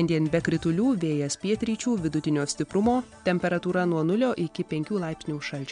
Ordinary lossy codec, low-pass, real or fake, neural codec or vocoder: MP3, 64 kbps; 9.9 kHz; real; none